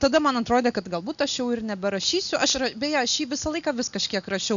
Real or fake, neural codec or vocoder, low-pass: real; none; 7.2 kHz